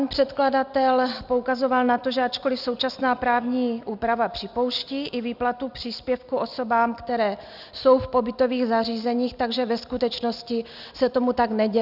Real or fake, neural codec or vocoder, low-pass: real; none; 5.4 kHz